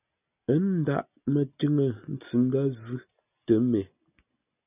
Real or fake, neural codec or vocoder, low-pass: real; none; 3.6 kHz